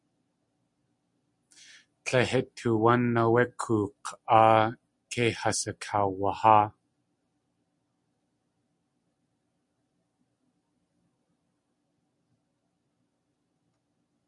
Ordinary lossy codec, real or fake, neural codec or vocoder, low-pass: MP3, 96 kbps; real; none; 10.8 kHz